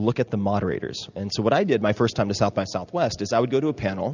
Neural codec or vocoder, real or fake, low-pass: none; real; 7.2 kHz